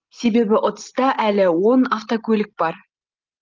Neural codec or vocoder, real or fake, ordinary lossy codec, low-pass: none; real; Opus, 32 kbps; 7.2 kHz